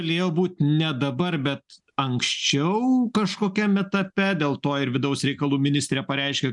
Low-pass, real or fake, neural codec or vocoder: 10.8 kHz; real; none